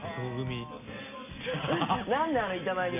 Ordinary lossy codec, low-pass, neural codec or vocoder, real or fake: none; 3.6 kHz; none; real